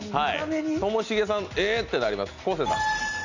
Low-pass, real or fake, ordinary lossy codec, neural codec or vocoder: 7.2 kHz; real; none; none